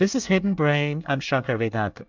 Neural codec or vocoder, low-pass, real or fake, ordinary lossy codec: codec, 24 kHz, 1 kbps, SNAC; 7.2 kHz; fake; MP3, 64 kbps